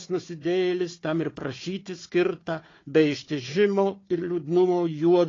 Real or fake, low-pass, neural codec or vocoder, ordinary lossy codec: fake; 7.2 kHz; codec, 16 kHz, 4 kbps, FunCodec, trained on Chinese and English, 50 frames a second; AAC, 32 kbps